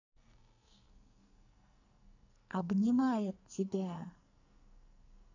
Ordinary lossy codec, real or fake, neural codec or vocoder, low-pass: none; fake; codec, 44.1 kHz, 2.6 kbps, SNAC; 7.2 kHz